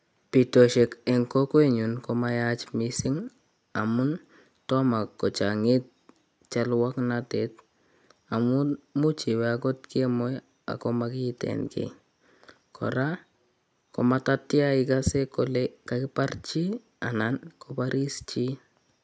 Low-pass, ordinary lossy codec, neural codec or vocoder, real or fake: none; none; none; real